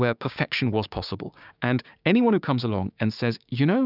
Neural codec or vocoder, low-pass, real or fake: codec, 16 kHz, 6 kbps, DAC; 5.4 kHz; fake